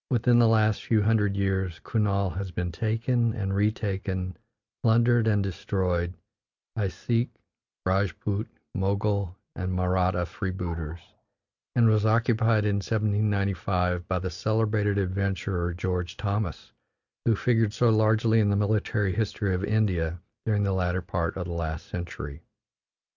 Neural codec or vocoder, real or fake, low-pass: none; real; 7.2 kHz